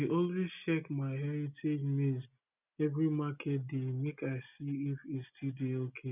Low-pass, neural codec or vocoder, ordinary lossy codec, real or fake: 3.6 kHz; none; none; real